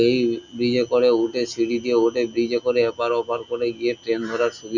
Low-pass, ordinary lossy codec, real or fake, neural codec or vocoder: 7.2 kHz; none; real; none